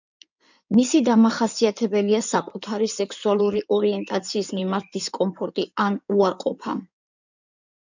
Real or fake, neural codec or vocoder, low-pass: fake; codec, 16 kHz in and 24 kHz out, 2.2 kbps, FireRedTTS-2 codec; 7.2 kHz